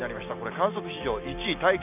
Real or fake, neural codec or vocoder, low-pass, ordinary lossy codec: real; none; 3.6 kHz; none